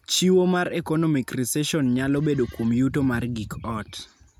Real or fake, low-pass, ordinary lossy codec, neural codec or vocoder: real; 19.8 kHz; none; none